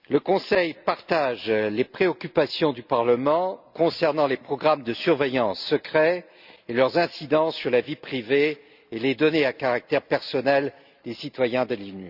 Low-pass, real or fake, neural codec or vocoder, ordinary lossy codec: 5.4 kHz; real; none; none